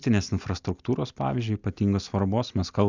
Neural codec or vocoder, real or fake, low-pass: none; real; 7.2 kHz